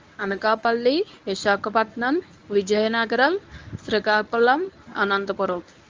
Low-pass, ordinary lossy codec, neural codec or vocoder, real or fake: 7.2 kHz; Opus, 24 kbps; codec, 24 kHz, 0.9 kbps, WavTokenizer, medium speech release version 1; fake